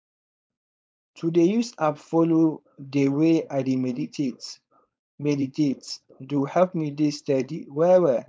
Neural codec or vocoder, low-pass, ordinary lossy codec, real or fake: codec, 16 kHz, 4.8 kbps, FACodec; none; none; fake